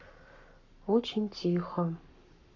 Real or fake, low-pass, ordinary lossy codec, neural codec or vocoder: fake; 7.2 kHz; none; codec, 44.1 kHz, 7.8 kbps, Pupu-Codec